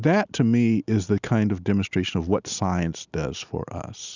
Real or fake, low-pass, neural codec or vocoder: real; 7.2 kHz; none